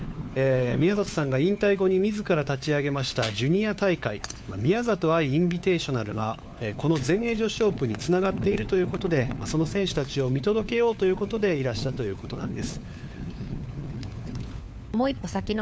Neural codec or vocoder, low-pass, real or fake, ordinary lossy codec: codec, 16 kHz, 4 kbps, FunCodec, trained on LibriTTS, 50 frames a second; none; fake; none